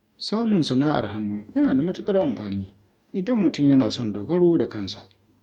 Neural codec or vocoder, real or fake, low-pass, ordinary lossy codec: codec, 44.1 kHz, 2.6 kbps, DAC; fake; 19.8 kHz; none